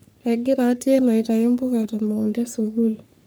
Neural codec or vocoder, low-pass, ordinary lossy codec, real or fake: codec, 44.1 kHz, 3.4 kbps, Pupu-Codec; none; none; fake